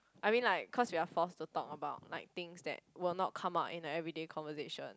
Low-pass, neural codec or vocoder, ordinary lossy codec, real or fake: none; none; none; real